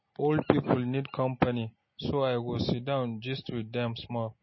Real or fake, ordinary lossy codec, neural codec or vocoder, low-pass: real; MP3, 24 kbps; none; 7.2 kHz